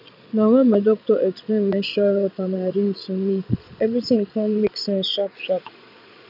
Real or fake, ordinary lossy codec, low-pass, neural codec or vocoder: fake; none; 5.4 kHz; vocoder, 22.05 kHz, 80 mel bands, Vocos